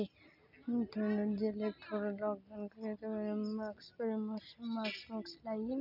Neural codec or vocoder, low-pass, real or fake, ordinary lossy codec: none; 5.4 kHz; real; none